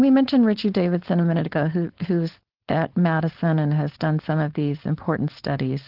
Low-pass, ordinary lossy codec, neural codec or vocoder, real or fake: 5.4 kHz; Opus, 16 kbps; codec, 16 kHz, 4.8 kbps, FACodec; fake